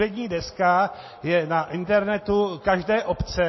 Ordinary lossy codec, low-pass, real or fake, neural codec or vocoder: MP3, 24 kbps; 7.2 kHz; real; none